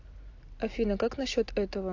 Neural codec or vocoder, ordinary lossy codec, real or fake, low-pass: none; AAC, 48 kbps; real; 7.2 kHz